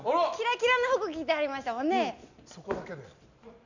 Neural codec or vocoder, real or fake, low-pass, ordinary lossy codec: none; real; 7.2 kHz; none